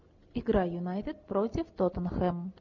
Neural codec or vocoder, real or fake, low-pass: none; real; 7.2 kHz